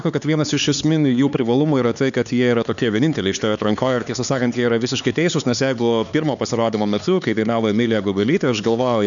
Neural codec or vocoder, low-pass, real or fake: codec, 16 kHz, 4 kbps, X-Codec, HuBERT features, trained on LibriSpeech; 7.2 kHz; fake